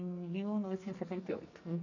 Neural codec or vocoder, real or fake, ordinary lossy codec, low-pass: codec, 44.1 kHz, 2.6 kbps, SNAC; fake; none; 7.2 kHz